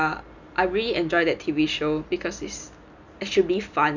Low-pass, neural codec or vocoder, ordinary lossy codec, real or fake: 7.2 kHz; none; none; real